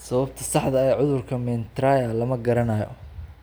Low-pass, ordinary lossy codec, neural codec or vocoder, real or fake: none; none; none; real